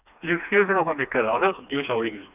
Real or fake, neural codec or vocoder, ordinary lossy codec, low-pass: fake; codec, 16 kHz, 2 kbps, FreqCodec, smaller model; none; 3.6 kHz